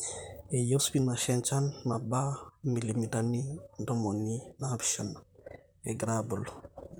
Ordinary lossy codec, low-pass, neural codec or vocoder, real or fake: none; none; vocoder, 44.1 kHz, 128 mel bands, Pupu-Vocoder; fake